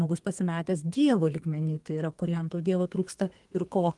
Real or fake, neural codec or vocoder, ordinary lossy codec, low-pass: fake; codec, 32 kHz, 1.9 kbps, SNAC; Opus, 24 kbps; 10.8 kHz